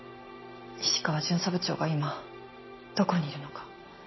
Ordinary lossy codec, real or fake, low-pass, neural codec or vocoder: MP3, 24 kbps; real; 7.2 kHz; none